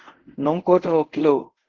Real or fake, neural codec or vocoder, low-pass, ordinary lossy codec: fake; codec, 24 kHz, 0.5 kbps, DualCodec; 7.2 kHz; Opus, 16 kbps